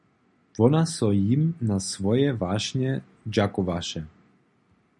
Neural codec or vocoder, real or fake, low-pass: none; real; 10.8 kHz